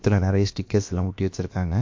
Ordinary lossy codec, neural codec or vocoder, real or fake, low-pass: MP3, 48 kbps; codec, 16 kHz, about 1 kbps, DyCAST, with the encoder's durations; fake; 7.2 kHz